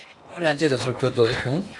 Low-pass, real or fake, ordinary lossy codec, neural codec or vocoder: 10.8 kHz; fake; MP3, 64 kbps; codec, 16 kHz in and 24 kHz out, 0.8 kbps, FocalCodec, streaming, 65536 codes